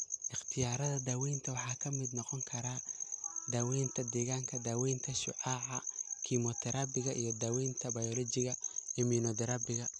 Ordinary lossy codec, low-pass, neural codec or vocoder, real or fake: none; none; none; real